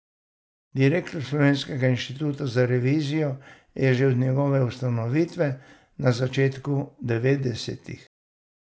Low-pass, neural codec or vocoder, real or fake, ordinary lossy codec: none; none; real; none